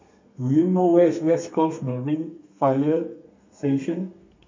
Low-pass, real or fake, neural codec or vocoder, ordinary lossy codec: 7.2 kHz; fake; codec, 32 kHz, 1.9 kbps, SNAC; MP3, 48 kbps